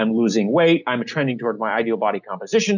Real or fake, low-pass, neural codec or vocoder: real; 7.2 kHz; none